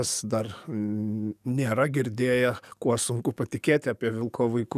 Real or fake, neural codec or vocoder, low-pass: fake; codec, 44.1 kHz, 7.8 kbps, DAC; 14.4 kHz